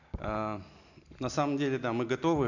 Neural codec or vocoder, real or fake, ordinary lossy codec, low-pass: none; real; none; 7.2 kHz